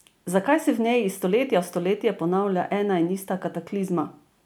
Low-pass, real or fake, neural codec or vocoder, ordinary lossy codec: none; real; none; none